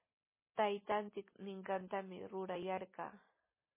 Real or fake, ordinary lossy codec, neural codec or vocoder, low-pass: real; MP3, 16 kbps; none; 3.6 kHz